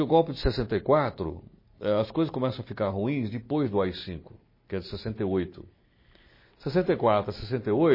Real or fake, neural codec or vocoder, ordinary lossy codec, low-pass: real; none; MP3, 24 kbps; 5.4 kHz